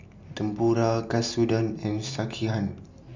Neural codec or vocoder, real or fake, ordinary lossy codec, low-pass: none; real; MP3, 64 kbps; 7.2 kHz